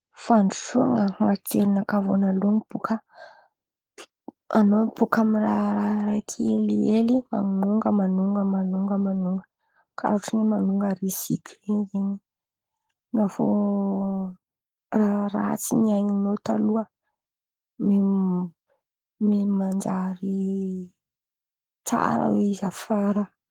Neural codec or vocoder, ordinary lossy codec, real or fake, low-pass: codec, 44.1 kHz, 7.8 kbps, Pupu-Codec; Opus, 24 kbps; fake; 19.8 kHz